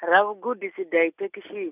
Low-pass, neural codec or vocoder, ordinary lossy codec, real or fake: 3.6 kHz; none; none; real